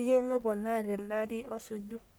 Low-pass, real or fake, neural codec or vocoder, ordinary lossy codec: none; fake; codec, 44.1 kHz, 1.7 kbps, Pupu-Codec; none